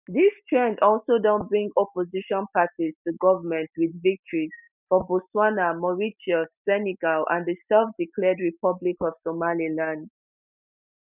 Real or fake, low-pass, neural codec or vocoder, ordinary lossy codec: real; 3.6 kHz; none; none